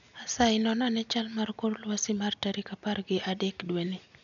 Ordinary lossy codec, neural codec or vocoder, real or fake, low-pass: none; none; real; 7.2 kHz